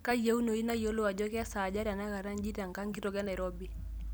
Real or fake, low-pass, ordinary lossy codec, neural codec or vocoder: real; none; none; none